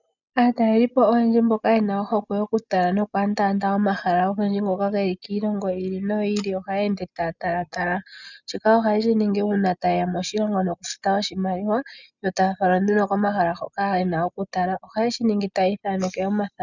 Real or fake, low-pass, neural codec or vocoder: real; 7.2 kHz; none